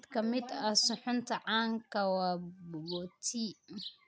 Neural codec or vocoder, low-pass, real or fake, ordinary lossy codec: none; none; real; none